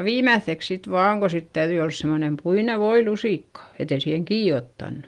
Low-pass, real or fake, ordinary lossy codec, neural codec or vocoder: 10.8 kHz; real; Opus, 24 kbps; none